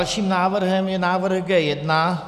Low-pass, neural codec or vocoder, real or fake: 14.4 kHz; none; real